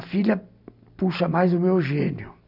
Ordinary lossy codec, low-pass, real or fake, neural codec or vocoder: none; 5.4 kHz; real; none